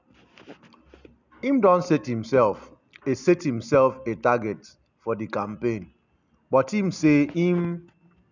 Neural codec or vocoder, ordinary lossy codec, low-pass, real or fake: none; none; 7.2 kHz; real